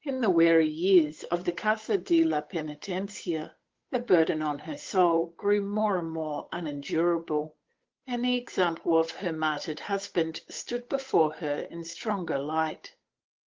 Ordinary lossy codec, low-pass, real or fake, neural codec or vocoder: Opus, 16 kbps; 7.2 kHz; fake; codec, 16 kHz, 8 kbps, FunCodec, trained on Chinese and English, 25 frames a second